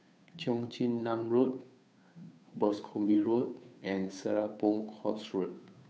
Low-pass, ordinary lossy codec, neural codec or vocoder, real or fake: none; none; codec, 16 kHz, 2 kbps, FunCodec, trained on Chinese and English, 25 frames a second; fake